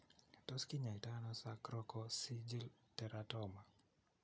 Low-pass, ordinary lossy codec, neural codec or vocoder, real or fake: none; none; none; real